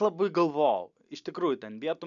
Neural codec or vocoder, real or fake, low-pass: none; real; 7.2 kHz